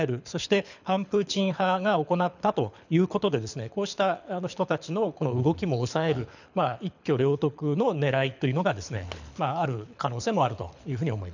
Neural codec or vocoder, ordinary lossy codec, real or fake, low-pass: codec, 24 kHz, 6 kbps, HILCodec; none; fake; 7.2 kHz